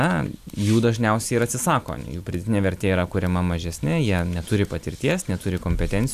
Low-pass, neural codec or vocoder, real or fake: 14.4 kHz; none; real